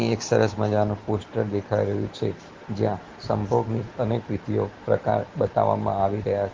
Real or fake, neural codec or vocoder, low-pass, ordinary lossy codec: real; none; 7.2 kHz; Opus, 16 kbps